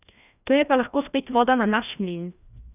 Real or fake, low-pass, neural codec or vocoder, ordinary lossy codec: fake; 3.6 kHz; codec, 16 kHz, 1 kbps, FreqCodec, larger model; none